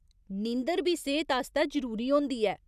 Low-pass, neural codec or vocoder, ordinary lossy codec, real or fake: 14.4 kHz; none; none; real